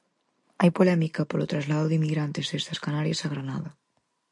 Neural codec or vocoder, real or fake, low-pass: none; real; 10.8 kHz